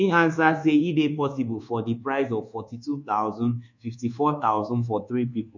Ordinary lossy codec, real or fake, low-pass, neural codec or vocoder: none; fake; 7.2 kHz; codec, 24 kHz, 1.2 kbps, DualCodec